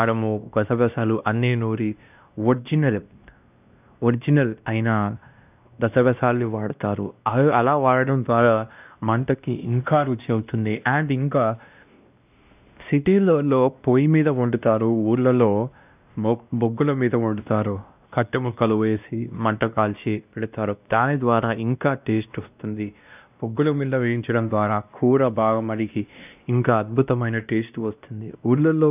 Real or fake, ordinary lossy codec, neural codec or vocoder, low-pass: fake; none; codec, 16 kHz, 1 kbps, X-Codec, WavLM features, trained on Multilingual LibriSpeech; 3.6 kHz